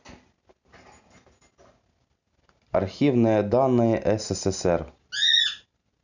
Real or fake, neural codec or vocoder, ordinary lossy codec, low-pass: real; none; none; 7.2 kHz